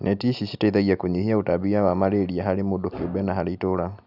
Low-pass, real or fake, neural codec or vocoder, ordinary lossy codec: 5.4 kHz; real; none; none